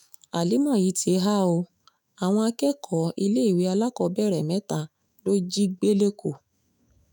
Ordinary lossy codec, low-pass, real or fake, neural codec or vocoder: none; none; fake; autoencoder, 48 kHz, 128 numbers a frame, DAC-VAE, trained on Japanese speech